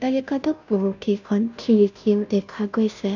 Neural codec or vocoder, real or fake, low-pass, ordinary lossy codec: codec, 16 kHz, 0.5 kbps, FunCodec, trained on Chinese and English, 25 frames a second; fake; 7.2 kHz; none